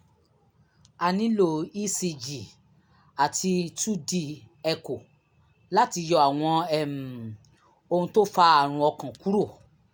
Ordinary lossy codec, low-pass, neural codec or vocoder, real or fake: none; none; none; real